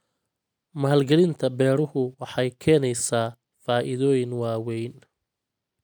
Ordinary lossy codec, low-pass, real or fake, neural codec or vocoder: none; none; real; none